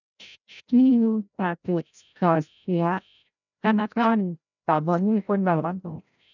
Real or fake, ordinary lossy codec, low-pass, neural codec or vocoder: fake; none; 7.2 kHz; codec, 16 kHz, 0.5 kbps, FreqCodec, larger model